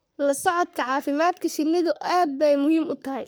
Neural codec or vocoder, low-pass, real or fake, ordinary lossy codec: codec, 44.1 kHz, 3.4 kbps, Pupu-Codec; none; fake; none